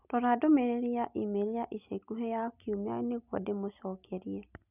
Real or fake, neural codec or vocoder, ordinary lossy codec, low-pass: real; none; none; 3.6 kHz